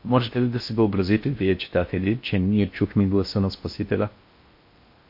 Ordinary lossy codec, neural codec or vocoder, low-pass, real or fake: MP3, 32 kbps; codec, 16 kHz in and 24 kHz out, 0.6 kbps, FocalCodec, streaming, 4096 codes; 5.4 kHz; fake